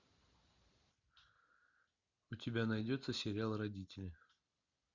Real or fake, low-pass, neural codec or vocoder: real; 7.2 kHz; none